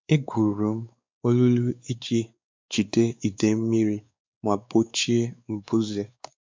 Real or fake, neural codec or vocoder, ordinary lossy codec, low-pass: fake; codec, 16 kHz, 4 kbps, X-Codec, WavLM features, trained on Multilingual LibriSpeech; MP3, 64 kbps; 7.2 kHz